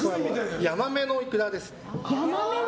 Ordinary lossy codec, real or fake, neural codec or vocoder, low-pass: none; real; none; none